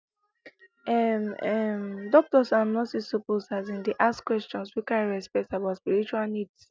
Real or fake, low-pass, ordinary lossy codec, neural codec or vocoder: real; none; none; none